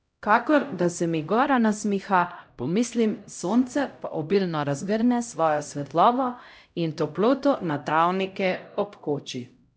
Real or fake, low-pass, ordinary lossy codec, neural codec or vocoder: fake; none; none; codec, 16 kHz, 0.5 kbps, X-Codec, HuBERT features, trained on LibriSpeech